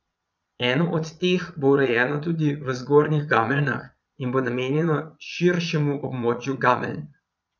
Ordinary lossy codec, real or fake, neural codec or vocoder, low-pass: none; fake; vocoder, 22.05 kHz, 80 mel bands, Vocos; 7.2 kHz